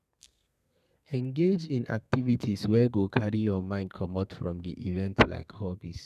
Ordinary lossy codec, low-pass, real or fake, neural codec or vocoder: none; 14.4 kHz; fake; codec, 32 kHz, 1.9 kbps, SNAC